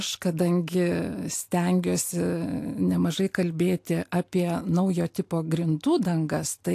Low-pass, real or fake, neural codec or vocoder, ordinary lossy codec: 14.4 kHz; real; none; AAC, 64 kbps